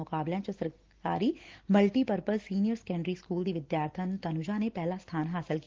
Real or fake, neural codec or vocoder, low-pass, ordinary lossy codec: real; none; 7.2 kHz; Opus, 16 kbps